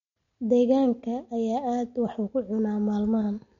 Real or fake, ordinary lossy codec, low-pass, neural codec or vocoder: real; MP3, 48 kbps; 7.2 kHz; none